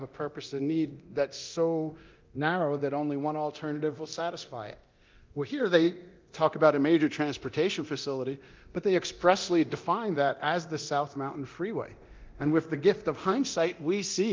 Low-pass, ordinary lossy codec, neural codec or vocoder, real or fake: 7.2 kHz; Opus, 32 kbps; codec, 24 kHz, 0.9 kbps, DualCodec; fake